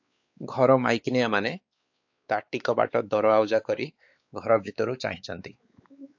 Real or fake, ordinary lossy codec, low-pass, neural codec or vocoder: fake; AAC, 48 kbps; 7.2 kHz; codec, 16 kHz, 4 kbps, X-Codec, WavLM features, trained on Multilingual LibriSpeech